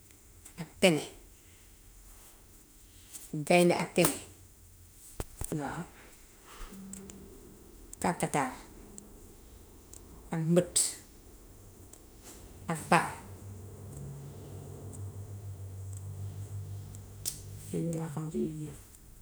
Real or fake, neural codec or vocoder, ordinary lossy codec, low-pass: fake; autoencoder, 48 kHz, 32 numbers a frame, DAC-VAE, trained on Japanese speech; none; none